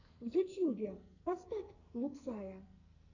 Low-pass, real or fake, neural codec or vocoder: 7.2 kHz; fake; codec, 44.1 kHz, 2.6 kbps, SNAC